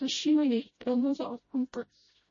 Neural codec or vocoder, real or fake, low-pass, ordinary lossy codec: codec, 16 kHz, 0.5 kbps, FreqCodec, smaller model; fake; 7.2 kHz; MP3, 32 kbps